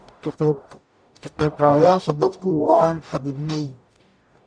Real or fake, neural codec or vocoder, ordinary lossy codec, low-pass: fake; codec, 44.1 kHz, 0.9 kbps, DAC; none; 9.9 kHz